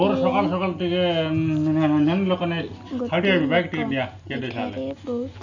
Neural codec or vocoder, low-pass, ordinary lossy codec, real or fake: none; 7.2 kHz; none; real